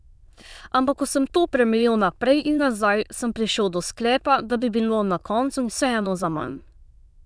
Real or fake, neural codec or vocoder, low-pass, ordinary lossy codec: fake; autoencoder, 22.05 kHz, a latent of 192 numbers a frame, VITS, trained on many speakers; none; none